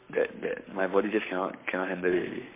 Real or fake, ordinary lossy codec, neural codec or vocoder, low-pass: fake; MP3, 24 kbps; codec, 44.1 kHz, 7.8 kbps, Pupu-Codec; 3.6 kHz